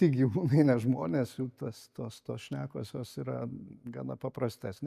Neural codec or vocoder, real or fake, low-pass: none; real; 14.4 kHz